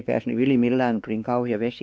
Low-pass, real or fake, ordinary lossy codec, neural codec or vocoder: none; fake; none; codec, 16 kHz, 2 kbps, X-Codec, WavLM features, trained on Multilingual LibriSpeech